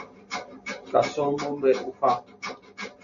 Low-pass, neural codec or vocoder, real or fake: 7.2 kHz; none; real